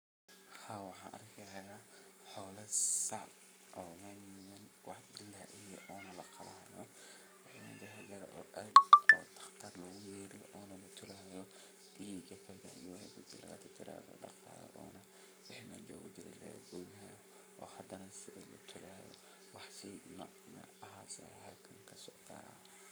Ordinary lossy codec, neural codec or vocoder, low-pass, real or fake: none; codec, 44.1 kHz, 7.8 kbps, Pupu-Codec; none; fake